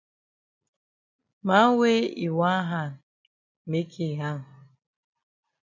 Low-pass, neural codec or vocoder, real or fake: 7.2 kHz; none; real